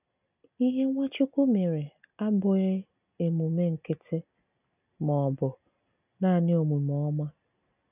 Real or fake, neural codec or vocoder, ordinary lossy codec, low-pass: real; none; none; 3.6 kHz